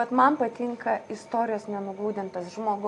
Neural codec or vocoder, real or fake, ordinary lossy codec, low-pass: none; real; AAC, 48 kbps; 10.8 kHz